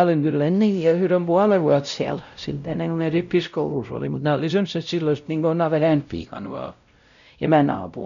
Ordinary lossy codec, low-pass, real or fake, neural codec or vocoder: none; 7.2 kHz; fake; codec, 16 kHz, 0.5 kbps, X-Codec, WavLM features, trained on Multilingual LibriSpeech